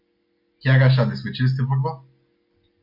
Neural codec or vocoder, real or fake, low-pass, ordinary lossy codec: none; real; 5.4 kHz; MP3, 48 kbps